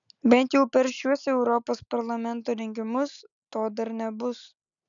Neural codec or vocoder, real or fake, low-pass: none; real; 7.2 kHz